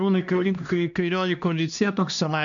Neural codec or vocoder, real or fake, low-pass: codec, 16 kHz, 1 kbps, X-Codec, HuBERT features, trained on LibriSpeech; fake; 7.2 kHz